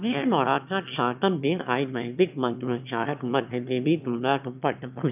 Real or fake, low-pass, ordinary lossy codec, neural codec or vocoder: fake; 3.6 kHz; none; autoencoder, 22.05 kHz, a latent of 192 numbers a frame, VITS, trained on one speaker